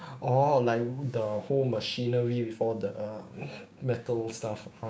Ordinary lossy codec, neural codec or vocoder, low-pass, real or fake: none; codec, 16 kHz, 6 kbps, DAC; none; fake